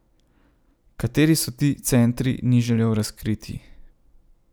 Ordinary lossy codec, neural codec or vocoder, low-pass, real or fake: none; none; none; real